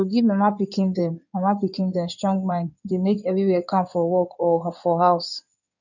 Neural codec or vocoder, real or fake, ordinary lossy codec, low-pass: codec, 16 kHz in and 24 kHz out, 2.2 kbps, FireRedTTS-2 codec; fake; none; 7.2 kHz